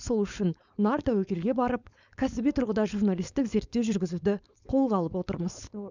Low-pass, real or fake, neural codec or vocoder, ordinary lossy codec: 7.2 kHz; fake; codec, 16 kHz, 4.8 kbps, FACodec; none